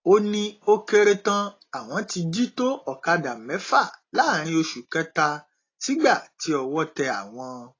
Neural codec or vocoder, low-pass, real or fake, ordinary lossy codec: none; 7.2 kHz; real; AAC, 32 kbps